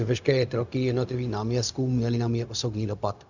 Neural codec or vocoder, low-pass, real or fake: codec, 16 kHz, 0.4 kbps, LongCat-Audio-Codec; 7.2 kHz; fake